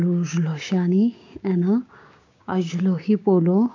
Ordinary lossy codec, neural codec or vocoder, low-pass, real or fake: AAC, 48 kbps; codec, 16 kHz, 6 kbps, DAC; 7.2 kHz; fake